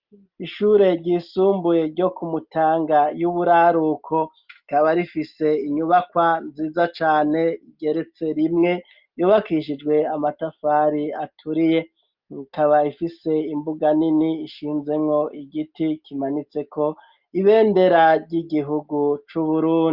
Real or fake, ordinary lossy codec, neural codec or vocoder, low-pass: real; Opus, 32 kbps; none; 5.4 kHz